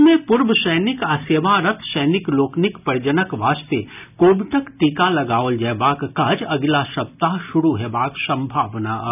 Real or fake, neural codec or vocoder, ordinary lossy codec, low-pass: real; none; none; 3.6 kHz